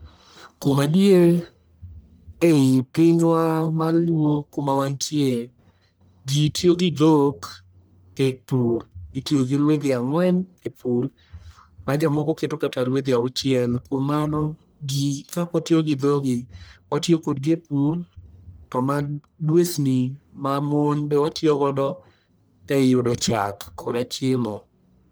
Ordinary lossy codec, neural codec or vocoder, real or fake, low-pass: none; codec, 44.1 kHz, 1.7 kbps, Pupu-Codec; fake; none